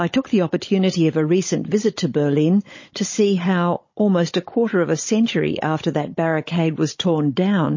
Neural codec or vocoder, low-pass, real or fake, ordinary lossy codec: codec, 16 kHz, 16 kbps, FunCodec, trained on Chinese and English, 50 frames a second; 7.2 kHz; fake; MP3, 32 kbps